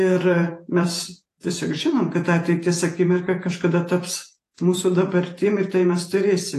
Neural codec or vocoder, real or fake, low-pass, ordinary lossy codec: vocoder, 48 kHz, 128 mel bands, Vocos; fake; 14.4 kHz; AAC, 48 kbps